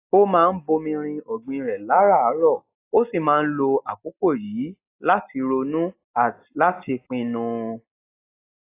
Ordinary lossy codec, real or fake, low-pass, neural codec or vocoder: AAC, 24 kbps; real; 3.6 kHz; none